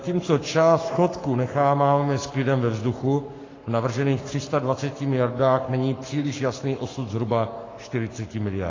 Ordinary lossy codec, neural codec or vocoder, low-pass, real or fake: AAC, 32 kbps; codec, 44.1 kHz, 7.8 kbps, Pupu-Codec; 7.2 kHz; fake